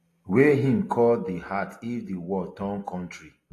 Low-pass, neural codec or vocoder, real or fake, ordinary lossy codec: 14.4 kHz; none; real; AAC, 48 kbps